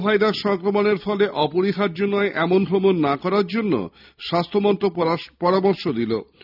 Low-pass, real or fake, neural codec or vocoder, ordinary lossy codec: 5.4 kHz; real; none; none